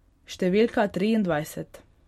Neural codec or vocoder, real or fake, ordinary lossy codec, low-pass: none; real; MP3, 64 kbps; 19.8 kHz